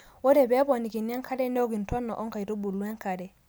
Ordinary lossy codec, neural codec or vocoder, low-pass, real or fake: none; none; none; real